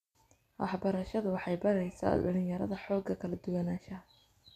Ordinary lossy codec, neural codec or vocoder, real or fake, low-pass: none; none; real; 14.4 kHz